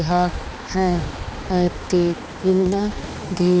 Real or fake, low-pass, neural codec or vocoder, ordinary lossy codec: fake; none; codec, 16 kHz, 2 kbps, X-Codec, HuBERT features, trained on balanced general audio; none